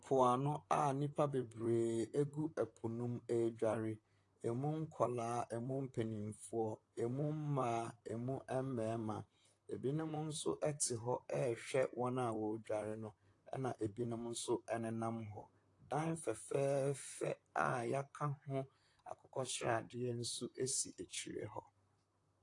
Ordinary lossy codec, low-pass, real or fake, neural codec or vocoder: AAC, 48 kbps; 10.8 kHz; fake; vocoder, 44.1 kHz, 128 mel bands, Pupu-Vocoder